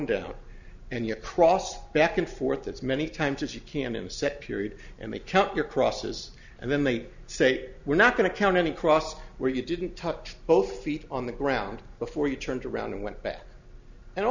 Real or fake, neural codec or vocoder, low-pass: real; none; 7.2 kHz